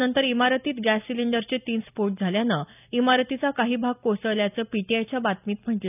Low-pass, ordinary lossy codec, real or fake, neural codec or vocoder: 3.6 kHz; none; real; none